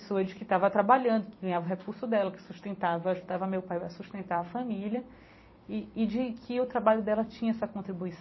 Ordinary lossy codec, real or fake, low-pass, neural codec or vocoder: MP3, 24 kbps; fake; 7.2 kHz; vocoder, 44.1 kHz, 128 mel bands every 256 samples, BigVGAN v2